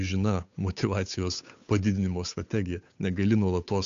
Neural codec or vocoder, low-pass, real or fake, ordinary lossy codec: codec, 16 kHz, 8 kbps, FunCodec, trained on Chinese and English, 25 frames a second; 7.2 kHz; fake; AAC, 64 kbps